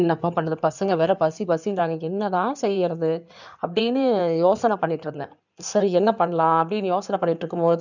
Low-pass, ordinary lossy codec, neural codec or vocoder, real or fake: 7.2 kHz; none; codec, 16 kHz in and 24 kHz out, 2.2 kbps, FireRedTTS-2 codec; fake